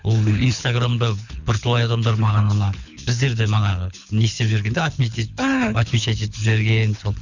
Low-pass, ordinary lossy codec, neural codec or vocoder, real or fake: 7.2 kHz; none; codec, 24 kHz, 6 kbps, HILCodec; fake